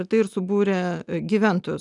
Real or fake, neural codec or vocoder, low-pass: real; none; 10.8 kHz